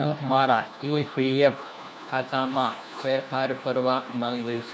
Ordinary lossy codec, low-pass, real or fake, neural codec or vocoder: none; none; fake; codec, 16 kHz, 1 kbps, FunCodec, trained on LibriTTS, 50 frames a second